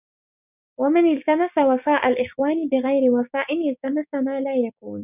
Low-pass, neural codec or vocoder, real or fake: 3.6 kHz; codec, 16 kHz, 6 kbps, DAC; fake